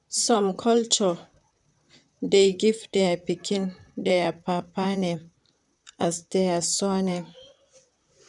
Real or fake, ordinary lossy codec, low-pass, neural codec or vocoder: fake; none; 10.8 kHz; vocoder, 44.1 kHz, 128 mel bands, Pupu-Vocoder